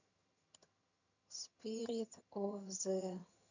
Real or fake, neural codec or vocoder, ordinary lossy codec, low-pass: fake; vocoder, 22.05 kHz, 80 mel bands, HiFi-GAN; none; 7.2 kHz